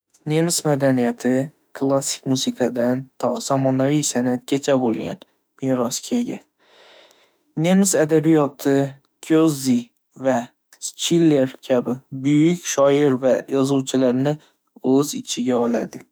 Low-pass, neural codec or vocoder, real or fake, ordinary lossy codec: none; autoencoder, 48 kHz, 32 numbers a frame, DAC-VAE, trained on Japanese speech; fake; none